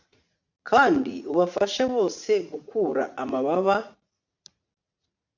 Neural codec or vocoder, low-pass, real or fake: vocoder, 44.1 kHz, 80 mel bands, Vocos; 7.2 kHz; fake